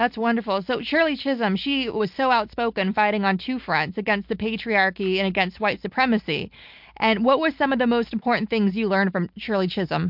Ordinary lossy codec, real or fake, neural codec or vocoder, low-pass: MP3, 48 kbps; real; none; 5.4 kHz